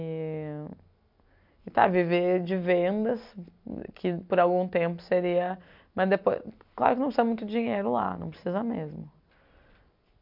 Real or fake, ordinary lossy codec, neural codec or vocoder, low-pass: real; MP3, 48 kbps; none; 5.4 kHz